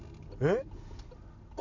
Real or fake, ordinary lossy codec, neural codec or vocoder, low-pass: real; none; none; 7.2 kHz